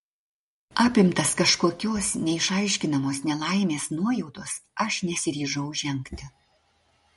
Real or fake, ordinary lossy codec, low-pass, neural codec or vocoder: real; MP3, 48 kbps; 14.4 kHz; none